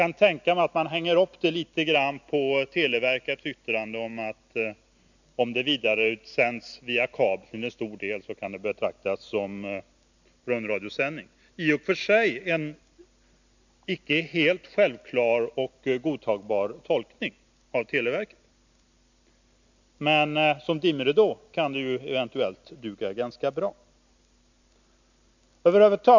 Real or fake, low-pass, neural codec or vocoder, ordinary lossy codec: real; 7.2 kHz; none; none